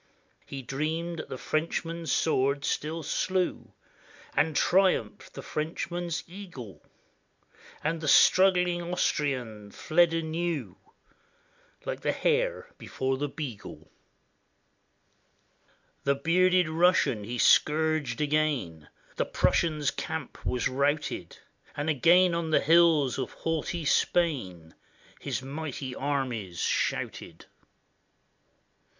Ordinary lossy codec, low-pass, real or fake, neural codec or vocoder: MP3, 64 kbps; 7.2 kHz; real; none